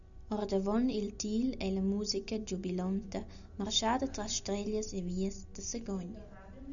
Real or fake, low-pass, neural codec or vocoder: real; 7.2 kHz; none